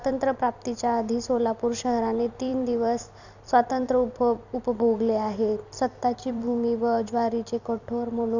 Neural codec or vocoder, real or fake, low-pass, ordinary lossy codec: vocoder, 44.1 kHz, 128 mel bands every 256 samples, BigVGAN v2; fake; 7.2 kHz; none